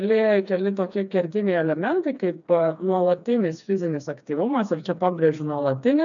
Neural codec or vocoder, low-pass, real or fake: codec, 16 kHz, 2 kbps, FreqCodec, smaller model; 7.2 kHz; fake